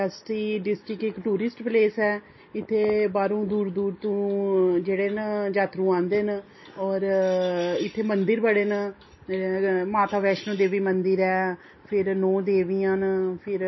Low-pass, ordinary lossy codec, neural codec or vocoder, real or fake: 7.2 kHz; MP3, 24 kbps; none; real